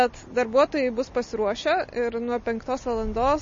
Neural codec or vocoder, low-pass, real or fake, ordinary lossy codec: none; 7.2 kHz; real; MP3, 32 kbps